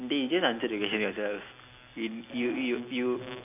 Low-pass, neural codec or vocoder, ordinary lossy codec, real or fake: 3.6 kHz; none; none; real